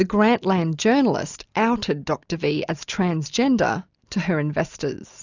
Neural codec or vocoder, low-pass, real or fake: none; 7.2 kHz; real